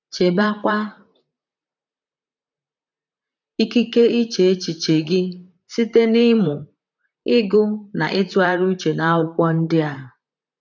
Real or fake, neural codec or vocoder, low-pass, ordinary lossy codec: fake; vocoder, 44.1 kHz, 128 mel bands, Pupu-Vocoder; 7.2 kHz; none